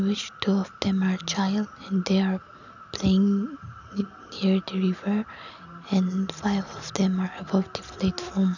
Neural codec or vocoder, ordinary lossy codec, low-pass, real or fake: none; none; 7.2 kHz; real